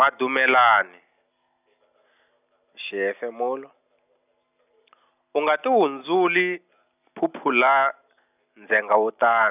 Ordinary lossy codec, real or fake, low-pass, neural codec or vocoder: none; real; 3.6 kHz; none